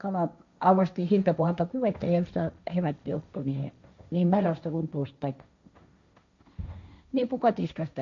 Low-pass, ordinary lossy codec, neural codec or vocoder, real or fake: 7.2 kHz; AAC, 64 kbps; codec, 16 kHz, 1.1 kbps, Voila-Tokenizer; fake